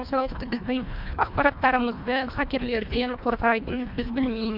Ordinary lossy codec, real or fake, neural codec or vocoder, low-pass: MP3, 48 kbps; fake; codec, 24 kHz, 1.5 kbps, HILCodec; 5.4 kHz